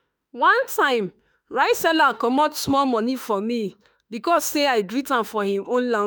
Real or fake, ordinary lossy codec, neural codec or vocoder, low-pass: fake; none; autoencoder, 48 kHz, 32 numbers a frame, DAC-VAE, trained on Japanese speech; none